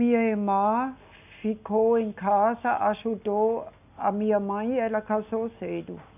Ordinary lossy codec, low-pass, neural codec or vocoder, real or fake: none; 3.6 kHz; none; real